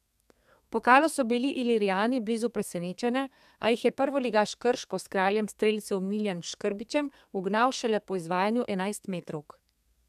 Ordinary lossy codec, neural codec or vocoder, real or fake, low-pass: none; codec, 32 kHz, 1.9 kbps, SNAC; fake; 14.4 kHz